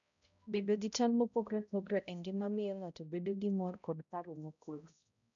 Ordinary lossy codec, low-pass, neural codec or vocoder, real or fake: none; 7.2 kHz; codec, 16 kHz, 0.5 kbps, X-Codec, HuBERT features, trained on balanced general audio; fake